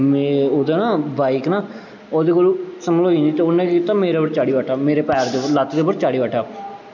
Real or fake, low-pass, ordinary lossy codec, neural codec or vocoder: real; 7.2 kHz; none; none